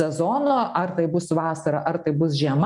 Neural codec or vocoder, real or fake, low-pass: none; real; 10.8 kHz